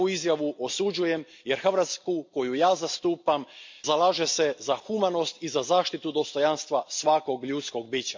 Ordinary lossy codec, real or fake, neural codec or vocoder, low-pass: MP3, 48 kbps; real; none; 7.2 kHz